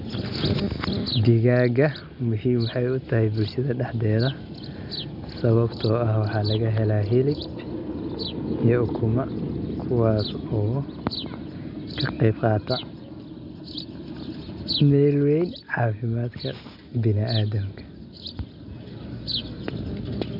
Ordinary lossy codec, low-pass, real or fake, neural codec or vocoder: none; 5.4 kHz; real; none